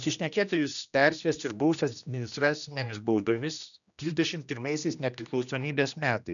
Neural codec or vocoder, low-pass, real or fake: codec, 16 kHz, 1 kbps, X-Codec, HuBERT features, trained on general audio; 7.2 kHz; fake